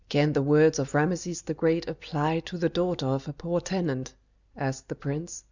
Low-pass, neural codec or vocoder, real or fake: 7.2 kHz; none; real